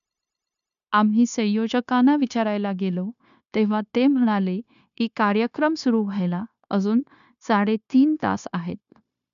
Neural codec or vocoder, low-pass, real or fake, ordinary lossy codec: codec, 16 kHz, 0.9 kbps, LongCat-Audio-Codec; 7.2 kHz; fake; none